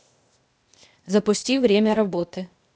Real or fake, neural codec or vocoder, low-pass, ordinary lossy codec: fake; codec, 16 kHz, 0.8 kbps, ZipCodec; none; none